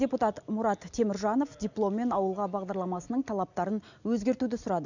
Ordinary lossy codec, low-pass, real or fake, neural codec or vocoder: none; 7.2 kHz; real; none